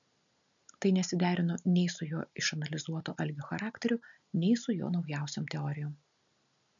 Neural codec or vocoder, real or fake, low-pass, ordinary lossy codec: none; real; 7.2 kHz; MP3, 96 kbps